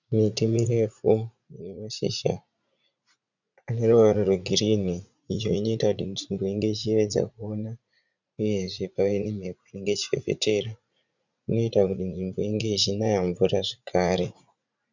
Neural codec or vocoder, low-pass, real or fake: vocoder, 44.1 kHz, 80 mel bands, Vocos; 7.2 kHz; fake